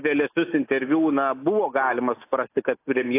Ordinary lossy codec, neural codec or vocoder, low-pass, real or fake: AAC, 24 kbps; none; 3.6 kHz; real